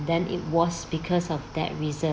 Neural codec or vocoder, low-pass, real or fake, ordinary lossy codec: none; none; real; none